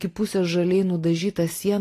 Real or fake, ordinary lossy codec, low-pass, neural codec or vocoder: real; AAC, 48 kbps; 14.4 kHz; none